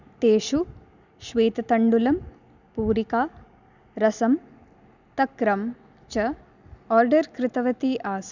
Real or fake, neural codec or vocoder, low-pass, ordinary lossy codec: real; none; 7.2 kHz; none